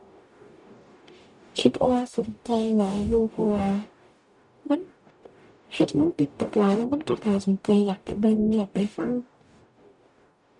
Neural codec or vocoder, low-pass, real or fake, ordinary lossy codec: codec, 44.1 kHz, 0.9 kbps, DAC; 10.8 kHz; fake; none